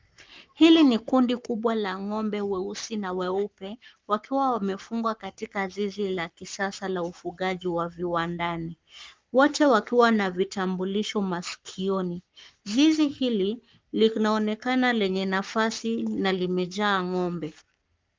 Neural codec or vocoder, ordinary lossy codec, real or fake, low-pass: codec, 44.1 kHz, 7.8 kbps, Pupu-Codec; Opus, 24 kbps; fake; 7.2 kHz